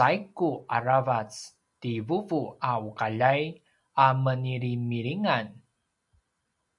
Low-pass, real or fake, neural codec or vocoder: 10.8 kHz; real; none